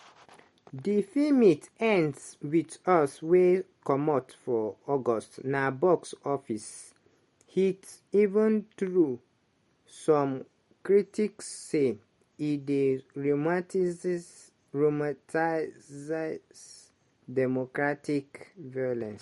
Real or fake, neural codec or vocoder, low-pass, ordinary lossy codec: real; none; 19.8 kHz; MP3, 48 kbps